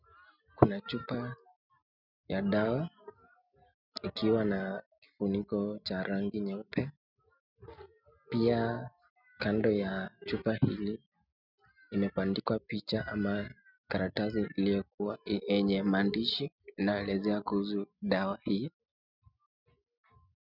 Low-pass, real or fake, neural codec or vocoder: 5.4 kHz; real; none